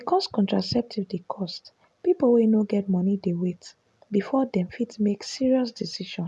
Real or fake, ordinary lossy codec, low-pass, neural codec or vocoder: real; none; none; none